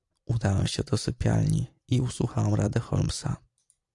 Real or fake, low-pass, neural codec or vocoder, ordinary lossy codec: fake; 10.8 kHz; vocoder, 44.1 kHz, 128 mel bands every 256 samples, BigVGAN v2; AAC, 64 kbps